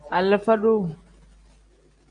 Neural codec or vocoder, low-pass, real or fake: none; 9.9 kHz; real